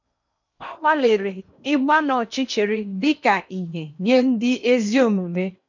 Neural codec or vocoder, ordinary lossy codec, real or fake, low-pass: codec, 16 kHz in and 24 kHz out, 0.8 kbps, FocalCodec, streaming, 65536 codes; none; fake; 7.2 kHz